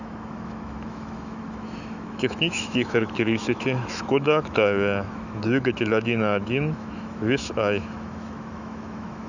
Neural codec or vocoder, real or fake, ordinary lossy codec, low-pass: autoencoder, 48 kHz, 128 numbers a frame, DAC-VAE, trained on Japanese speech; fake; none; 7.2 kHz